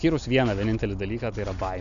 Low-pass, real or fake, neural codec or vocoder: 7.2 kHz; real; none